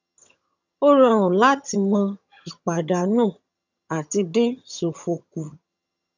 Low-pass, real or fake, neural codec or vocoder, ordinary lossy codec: 7.2 kHz; fake; vocoder, 22.05 kHz, 80 mel bands, HiFi-GAN; none